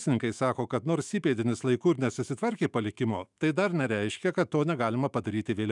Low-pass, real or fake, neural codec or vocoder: 10.8 kHz; fake; autoencoder, 48 kHz, 128 numbers a frame, DAC-VAE, trained on Japanese speech